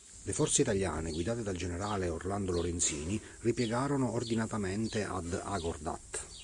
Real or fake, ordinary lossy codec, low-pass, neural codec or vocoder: real; Opus, 64 kbps; 10.8 kHz; none